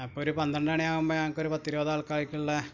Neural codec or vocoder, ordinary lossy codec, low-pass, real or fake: none; none; 7.2 kHz; real